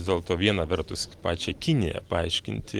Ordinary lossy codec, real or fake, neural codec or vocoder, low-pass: Opus, 24 kbps; real; none; 19.8 kHz